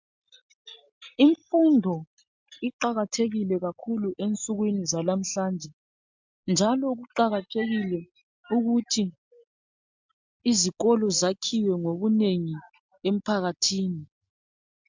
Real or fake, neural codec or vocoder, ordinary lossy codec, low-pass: real; none; MP3, 64 kbps; 7.2 kHz